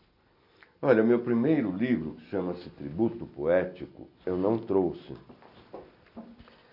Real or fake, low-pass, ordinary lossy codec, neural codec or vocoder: real; 5.4 kHz; none; none